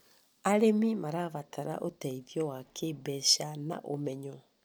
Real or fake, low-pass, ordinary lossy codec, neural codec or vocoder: real; none; none; none